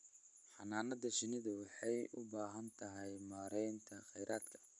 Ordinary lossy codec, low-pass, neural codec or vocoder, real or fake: none; 10.8 kHz; none; real